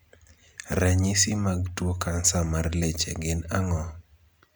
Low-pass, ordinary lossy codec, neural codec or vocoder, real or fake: none; none; none; real